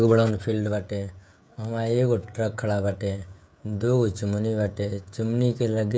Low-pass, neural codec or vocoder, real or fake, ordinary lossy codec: none; codec, 16 kHz, 16 kbps, FreqCodec, smaller model; fake; none